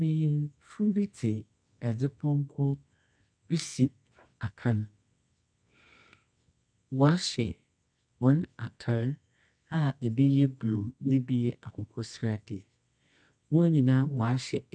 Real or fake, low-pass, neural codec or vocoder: fake; 9.9 kHz; codec, 24 kHz, 0.9 kbps, WavTokenizer, medium music audio release